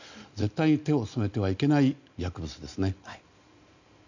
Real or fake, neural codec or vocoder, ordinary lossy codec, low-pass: real; none; none; 7.2 kHz